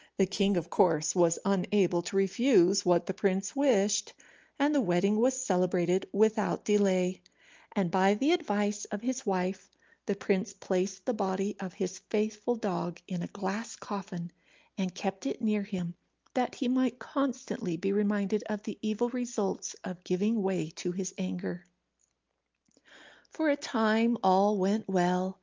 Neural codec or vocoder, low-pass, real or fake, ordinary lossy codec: none; 7.2 kHz; real; Opus, 24 kbps